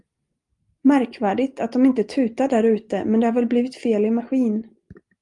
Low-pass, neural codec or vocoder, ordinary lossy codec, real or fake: 10.8 kHz; none; Opus, 32 kbps; real